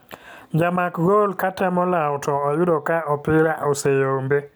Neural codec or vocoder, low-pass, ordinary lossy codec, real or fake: none; none; none; real